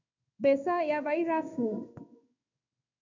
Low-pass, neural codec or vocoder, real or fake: 7.2 kHz; codec, 16 kHz in and 24 kHz out, 1 kbps, XY-Tokenizer; fake